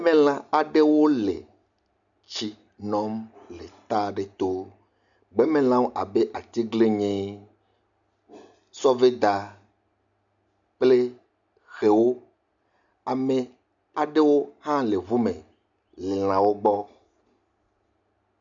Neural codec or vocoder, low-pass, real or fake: none; 7.2 kHz; real